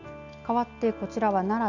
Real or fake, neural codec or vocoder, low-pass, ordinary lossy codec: real; none; 7.2 kHz; none